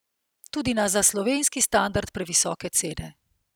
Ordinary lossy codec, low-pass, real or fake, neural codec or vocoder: none; none; real; none